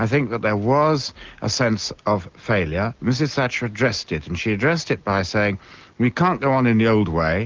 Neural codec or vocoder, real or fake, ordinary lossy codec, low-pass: none; real; Opus, 32 kbps; 7.2 kHz